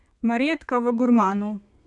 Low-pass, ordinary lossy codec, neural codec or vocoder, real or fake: 10.8 kHz; none; codec, 32 kHz, 1.9 kbps, SNAC; fake